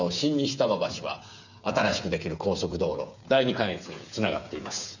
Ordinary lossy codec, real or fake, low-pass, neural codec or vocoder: none; fake; 7.2 kHz; codec, 16 kHz, 8 kbps, FreqCodec, smaller model